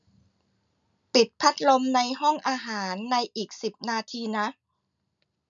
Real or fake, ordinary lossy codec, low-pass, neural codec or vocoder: real; none; 7.2 kHz; none